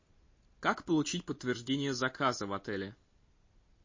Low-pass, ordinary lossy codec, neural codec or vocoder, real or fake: 7.2 kHz; MP3, 32 kbps; none; real